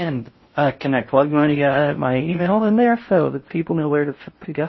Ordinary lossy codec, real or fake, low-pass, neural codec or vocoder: MP3, 24 kbps; fake; 7.2 kHz; codec, 16 kHz in and 24 kHz out, 0.6 kbps, FocalCodec, streaming, 4096 codes